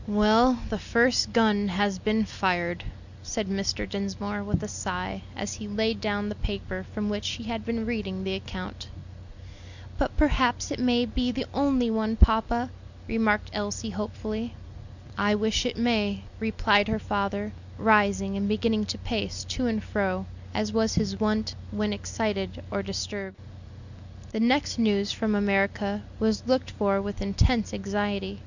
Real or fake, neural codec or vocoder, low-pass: real; none; 7.2 kHz